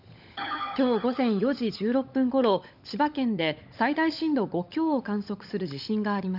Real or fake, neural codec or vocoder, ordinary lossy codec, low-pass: fake; codec, 16 kHz, 16 kbps, FunCodec, trained on LibriTTS, 50 frames a second; none; 5.4 kHz